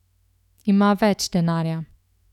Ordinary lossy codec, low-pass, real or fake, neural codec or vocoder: none; 19.8 kHz; fake; autoencoder, 48 kHz, 128 numbers a frame, DAC-VAE, trained on Japanese speech